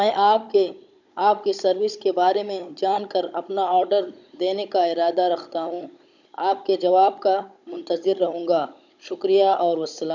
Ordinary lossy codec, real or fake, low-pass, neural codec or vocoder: none; fake; 7.2 kHz; codec, 16 kHz, 16 kbps, FunCodec, trained on Chinese and English, 50 frames a second